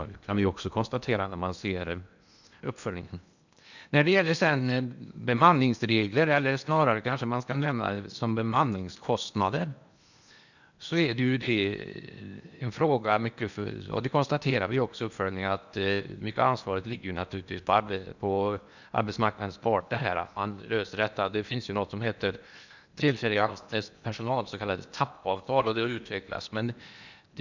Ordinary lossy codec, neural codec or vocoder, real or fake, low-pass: none; codec, 16 kHz in and 24 kHz out, 0.8 kbps, FocalCodec, streaming, 65536 codes; fake; 7.2 kHz